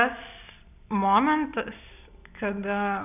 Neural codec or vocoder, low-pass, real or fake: none; 3.6 kHz; real